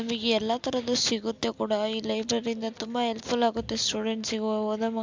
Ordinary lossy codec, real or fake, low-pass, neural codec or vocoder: MP3, 64 kbps; real; 7.2 kHz; none